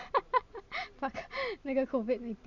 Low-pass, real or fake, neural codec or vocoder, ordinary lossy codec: 7.2 kHz; real; none; none